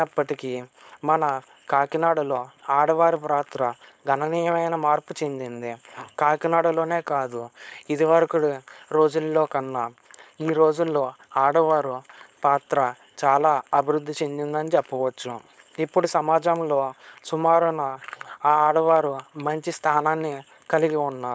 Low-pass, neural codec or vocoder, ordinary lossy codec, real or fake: none; codec, 16 kHz, 4.8 kbps, FACodec; none; fake